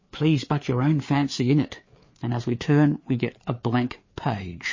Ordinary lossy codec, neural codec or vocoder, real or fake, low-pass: MP3, 32 kbps; codec, 16 kHz, 4 kbps, FreqCodec, larger model; fake; 7.2 kHz